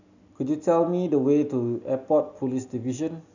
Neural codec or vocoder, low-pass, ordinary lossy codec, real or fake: none; 7.2 kHz; none; real